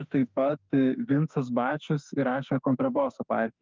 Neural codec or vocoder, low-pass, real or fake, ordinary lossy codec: autoencoder, 48 kHz, 32 numbers a frame, DAC-VAE, trained on Japanese speech; 7.2 kHz; fake; Opus, 24 kbps